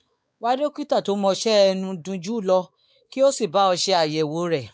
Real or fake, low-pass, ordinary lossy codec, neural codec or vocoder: fake; none; none; codec, 16 kHz, 4 kbps, X-Codec, WavLM features, trained on Multilingual LibriSpeech